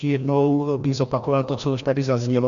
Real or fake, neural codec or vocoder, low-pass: fake; codec, 16 kHz, 1 kbps, FreqCodec, larger model; 7.2 kHz